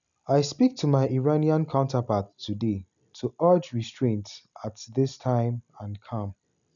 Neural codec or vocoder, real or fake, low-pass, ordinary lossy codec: none; real; 7.2 kHz; none